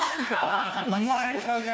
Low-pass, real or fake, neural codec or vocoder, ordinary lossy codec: none; fake; codec, 16 kHz, 1 kbps, FunCodec, trained on LibriTTS, 50 frames a second; none